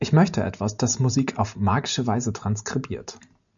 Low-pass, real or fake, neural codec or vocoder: 7.2 kHz; real; none